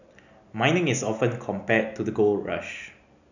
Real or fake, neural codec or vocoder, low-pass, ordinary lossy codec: real; none; 7.2 kHz; none